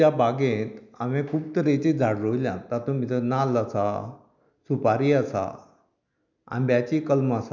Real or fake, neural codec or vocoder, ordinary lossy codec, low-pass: real; none; none; 7.2 kHz